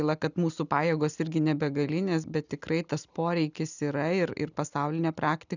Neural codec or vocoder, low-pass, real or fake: none; 7.2 kHz; real